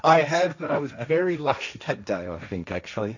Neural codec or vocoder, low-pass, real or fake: codec, 16 kHz, 1.1 kbps, Voila-Tokenizer; 7.2 kHz; fake